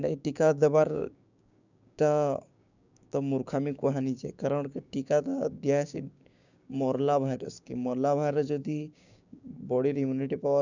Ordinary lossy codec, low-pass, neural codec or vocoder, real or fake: none; 7.2 kHz; codec, 16 kHz, 6 kbps, DAC; fake